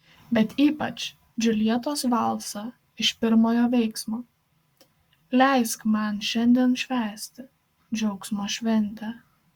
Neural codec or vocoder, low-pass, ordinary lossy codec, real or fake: codec, 44.1 kHz, 7.8 kbps, Pupu-Codec; 19.8 kHz; Opus, 64 kbps; fake